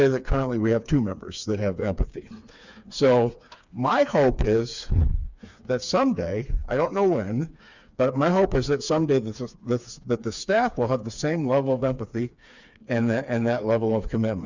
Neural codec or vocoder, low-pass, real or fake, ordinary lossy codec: codec, 16 kHz, 4 kbps, FreqCodec, smaller model; 7.2 kHz; fake; Opus, 64 kbps